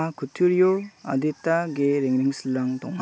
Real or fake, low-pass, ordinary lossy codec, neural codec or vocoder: real; none; none; none